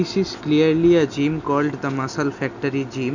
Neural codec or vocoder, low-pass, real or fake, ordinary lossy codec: none; 7.2 kHz; real; none